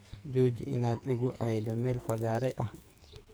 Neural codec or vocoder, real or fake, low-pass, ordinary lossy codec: codec, 44.1 kHz, 2.6 kbps, SNAC; fake; none; none